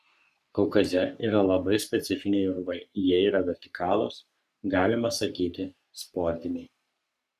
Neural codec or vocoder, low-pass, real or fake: codec, 44.1 kHz, 3.4 kbps, Pupu-Codec; 14.4 kHz; fake